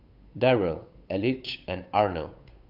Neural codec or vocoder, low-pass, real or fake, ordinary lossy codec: codec, 16 kHz, 8 kbps, FunCodec, trained on Chinese and English, 25 frames a second; 5.4 kHz; fake; Opus, 64 kbps